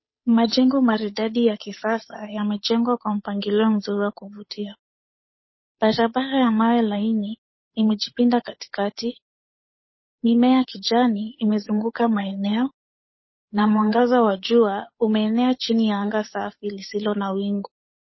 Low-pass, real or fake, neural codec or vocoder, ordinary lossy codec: 7.2 kHz; fake; codec, 16 kHz, 8 kbps, FunCodec, trained on Chinese and English, 25 frames a second; MP3, 24 kbps